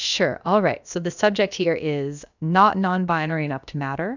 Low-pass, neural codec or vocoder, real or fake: 7.2 kHz; codec, 16 kHz, about 1 kbps, DyCAST, with the encoder's durations; fake